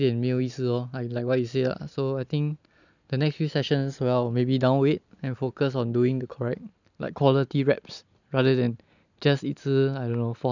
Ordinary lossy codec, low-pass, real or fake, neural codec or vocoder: none; 7.2 kHz; real; none